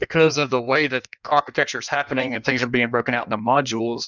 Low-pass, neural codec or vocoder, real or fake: 7.2 kHz; codec, 16 kHz in and 24 kHz out, 1.1 kbps, FireRedTTS-2 codec; fake